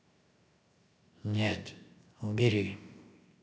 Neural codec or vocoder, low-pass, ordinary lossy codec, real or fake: codec, 16 kHz, 0.7 kbps, FocalCodec; none; none; fake